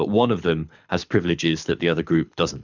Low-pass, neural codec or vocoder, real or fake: 7.2 kHz; codec, 24 kHz, 6 kbps, HILCodec; fake